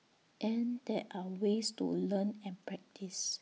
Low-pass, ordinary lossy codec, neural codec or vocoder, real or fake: none; none; none; real